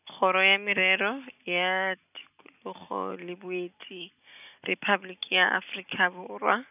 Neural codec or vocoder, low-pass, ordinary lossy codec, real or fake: none; 3.6 kHz; none; real